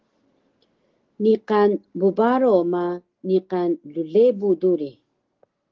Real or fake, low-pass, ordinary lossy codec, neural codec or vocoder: real; 7.2 kHz; Opus, 16 kbps; none